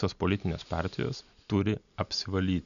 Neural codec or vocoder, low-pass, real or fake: none; 7.2 kHz; real